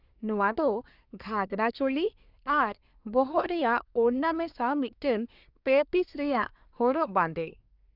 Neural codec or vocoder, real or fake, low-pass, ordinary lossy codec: codec, 24 kHz, 1 kbps, SNAC; fake; 5.4 kHz; none